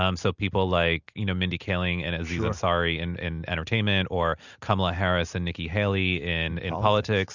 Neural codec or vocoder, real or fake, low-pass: none; real; 7.2 kHz